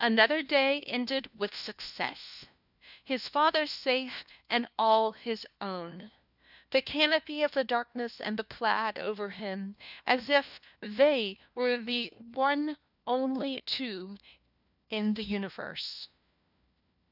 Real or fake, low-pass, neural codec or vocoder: fake; 5.4 kHz; codec, 16 kHz, 1 kbps, FunCodec, trained on LibriTTS, 50 frames a second